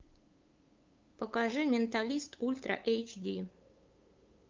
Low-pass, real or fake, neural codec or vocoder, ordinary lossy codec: 7.2 kHz; fake; codec, 16 kHz, 8 kbps, FunCodec, trained on LibriTTS, 25 frames a second; Opus, 24 kbps